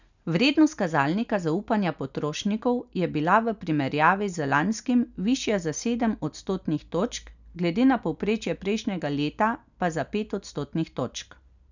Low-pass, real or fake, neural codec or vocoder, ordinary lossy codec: 7.2 kHz; real; none; none